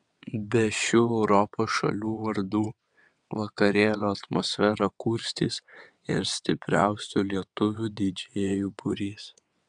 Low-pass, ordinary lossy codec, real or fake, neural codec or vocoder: 9.9 kHz; MP3, 96 kbps; fake; vocoder, 22.05 kHz, 80 mel bands, WaveNeXt